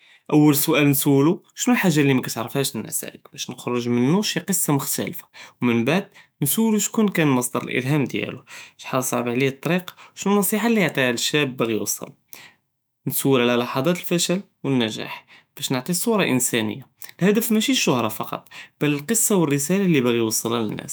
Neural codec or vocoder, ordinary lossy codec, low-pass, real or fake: autoencoder, 48 kHz, 128 numbers a frame, DAC-VAE, trained on Japanese speech; none; none; fake